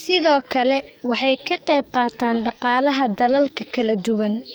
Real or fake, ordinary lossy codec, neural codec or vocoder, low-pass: fake; none; codec, 44.1 kHz, 2.6 kbps, SNAC; none